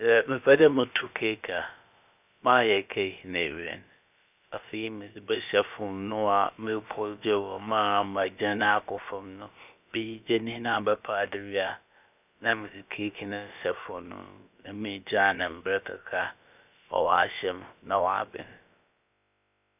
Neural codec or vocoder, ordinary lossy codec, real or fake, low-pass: codec, 16 kHz, about 1 kbps, DyCAST, with the encoder's durations; none; fake; 3.6 kHz